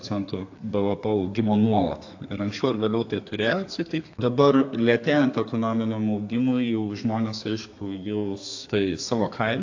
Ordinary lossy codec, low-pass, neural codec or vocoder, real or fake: AAC, 48 kbps; 7.2 kHz; codec, 32 kHz, 1.9 kbps, SNAC; fake